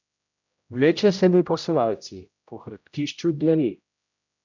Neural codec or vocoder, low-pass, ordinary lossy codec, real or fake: codec, 16 kHz, 0.5 kbps, X-Codec, HuBERT features, trained on general audio; 7.2 kHz; none; fake